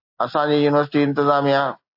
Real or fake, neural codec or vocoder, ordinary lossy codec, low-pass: real; none; AAC, 48 kbps; 5.4 kHz